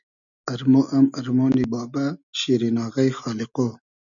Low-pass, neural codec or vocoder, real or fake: 7.2 kHz; none; real